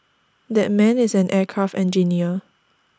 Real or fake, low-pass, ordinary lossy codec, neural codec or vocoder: real; none; none; none